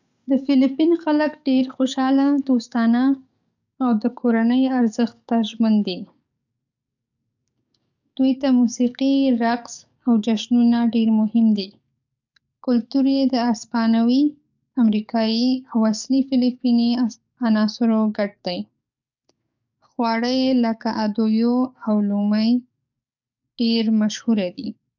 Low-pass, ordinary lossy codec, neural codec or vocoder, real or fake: 7.2 kHz; none; codec, 44.1 kHz, 7.8 kbps, DAC; fake